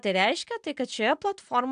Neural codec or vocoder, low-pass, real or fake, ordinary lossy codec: none; 9.9 kHz; real; AAC, 96 kbps